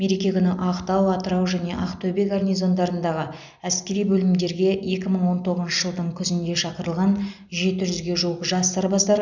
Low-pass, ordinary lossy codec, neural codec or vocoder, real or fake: 7.2 kHz; none; none; real